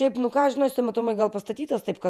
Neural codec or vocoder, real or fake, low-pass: vocoder, 48 kHz, 128 mel bands, Vocos; fake; 14.4 kHz